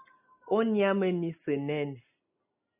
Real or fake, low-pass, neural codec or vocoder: real; 3.6 kHz; none